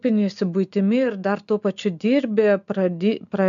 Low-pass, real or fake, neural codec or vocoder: 7.2 kHz; real; none